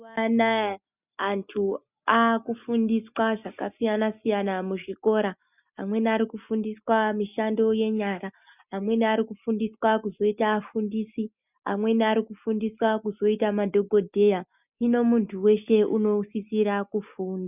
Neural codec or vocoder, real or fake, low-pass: none; real; 3.6 kHz